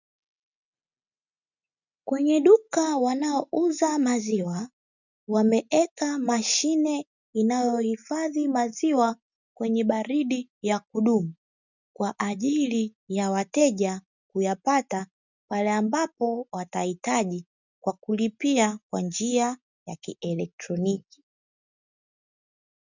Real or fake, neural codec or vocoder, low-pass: real; none; 7.2 kHz